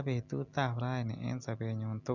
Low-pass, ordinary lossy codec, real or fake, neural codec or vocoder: 7.2 kHz; none; real; none